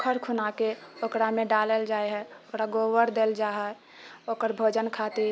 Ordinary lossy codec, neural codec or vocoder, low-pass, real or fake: none; none; none; real